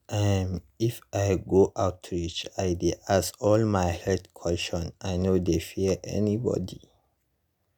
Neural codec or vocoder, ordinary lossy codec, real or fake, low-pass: none; none; real; none